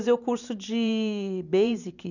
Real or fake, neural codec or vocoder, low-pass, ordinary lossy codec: fake; vocoder, 44.1 kHz, 128 mel bands every 512 samples, BigVGAN v2; 7.2 kHz; none